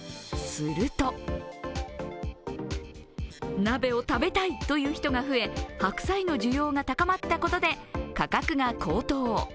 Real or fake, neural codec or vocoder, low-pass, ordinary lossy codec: real; none; none; none